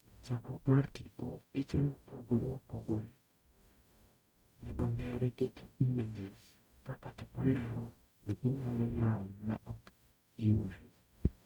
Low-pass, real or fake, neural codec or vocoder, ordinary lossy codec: 19.8 kHz; fake; codec, 44.1 kHz, 0.9 kbps, DAC; none